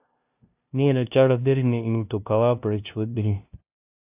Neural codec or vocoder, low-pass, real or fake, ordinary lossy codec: codec, 16 kHz, 0.5 kbps, FunCodec, trained on LibriTTS, 25 frames a second; 3.6 kHz; fake; AAC, 32 kbps